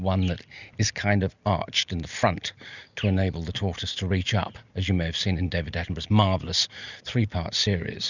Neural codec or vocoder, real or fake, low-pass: none; real; 7.2 kHz